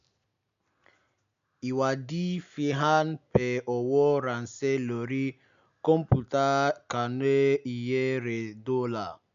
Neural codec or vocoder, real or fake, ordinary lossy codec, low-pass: none; real; none; 7.2 kHz